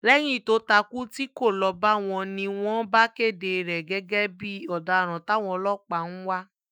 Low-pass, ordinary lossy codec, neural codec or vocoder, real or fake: none; none; autoencoder, 48 kHz, 128 numbers a frame, DAC-VAE, trained on Japanese speech; fake